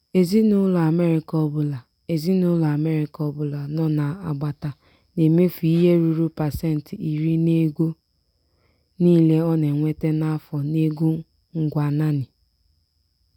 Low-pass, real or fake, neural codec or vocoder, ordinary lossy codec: 19.8 kHz; real; none; none